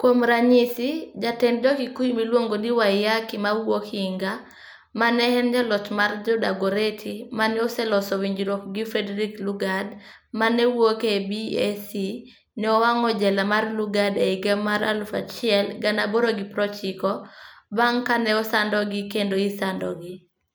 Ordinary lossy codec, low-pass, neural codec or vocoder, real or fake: none; none; none; real